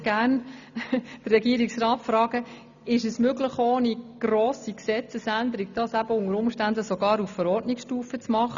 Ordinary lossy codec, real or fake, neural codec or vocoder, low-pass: none; real; none; 7.2 kHz